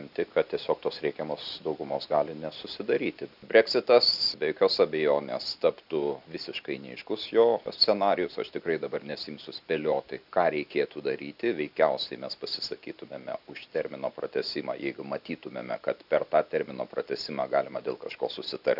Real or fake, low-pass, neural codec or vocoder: real; 5.4 kHz; none